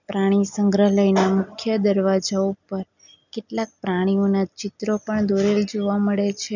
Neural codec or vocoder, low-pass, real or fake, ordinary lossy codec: none; 7.2 kHz; real; none